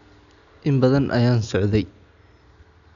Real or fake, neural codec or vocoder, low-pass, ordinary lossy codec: real; none; 7.2 kHz; none